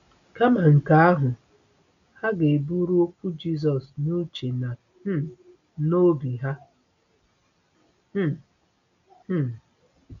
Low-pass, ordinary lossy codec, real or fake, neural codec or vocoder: 7.2 kHz; none; real; none